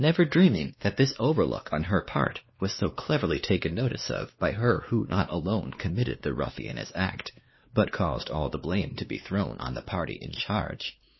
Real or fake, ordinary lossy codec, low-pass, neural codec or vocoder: fake; MP3, 24 kbps; 7.2 kHz; codec, 16 kHz, 2 kbps, X-Codec, WavLM features, trained on Multilingual LibriSpeech